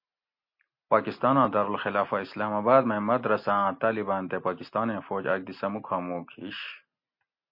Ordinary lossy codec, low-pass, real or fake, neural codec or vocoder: MP3, 32 kbps; 5.4 kHz; real; none